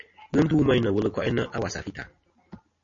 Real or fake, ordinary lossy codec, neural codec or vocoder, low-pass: real; MP3, 32 kbps; none; 7.2 kHz